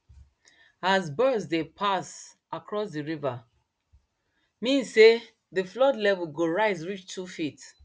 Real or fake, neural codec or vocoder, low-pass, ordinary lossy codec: real; none; none; none